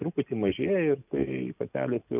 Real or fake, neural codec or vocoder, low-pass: real; none; 3.6 kHz